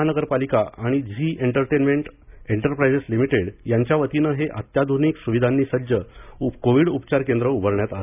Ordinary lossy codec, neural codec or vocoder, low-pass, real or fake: none; none; 3.6 kHz; real